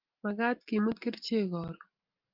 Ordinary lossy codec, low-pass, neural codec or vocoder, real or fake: Opus, 24 kbps; 5.4 kHz; none; real